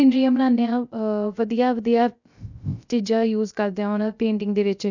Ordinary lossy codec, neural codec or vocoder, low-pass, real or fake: none; codec, 16 kHz, 0.3 kbps, FocalCodec; 7.2 kHz; fake